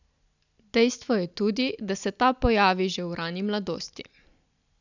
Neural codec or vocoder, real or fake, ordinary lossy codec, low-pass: none; real; none; 7.2 kHz